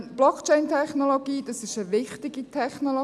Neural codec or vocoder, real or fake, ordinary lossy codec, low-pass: none; real; none; none